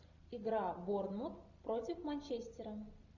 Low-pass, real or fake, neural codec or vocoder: 7.2 kHz; real; none